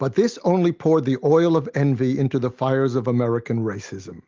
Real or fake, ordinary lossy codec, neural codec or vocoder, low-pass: real; Opus, 32 kbps; none; 7.2 kHz